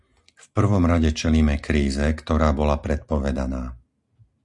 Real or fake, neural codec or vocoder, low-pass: real; none; 10.8 kHz